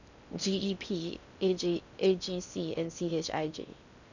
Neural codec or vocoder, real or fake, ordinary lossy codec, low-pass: codec, 16 kHz in and 24 kHz out, 0.6 kbps, FocalCodec, streaming, 4096 codes; fake; none; 7.2 kHz